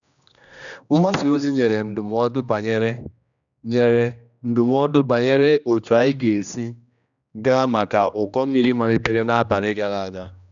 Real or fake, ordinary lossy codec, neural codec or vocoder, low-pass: fake; none; codec, 16 kHz, 1 kbps, X-Codec, HuBERT features, trained on general audio; 7.2 kHz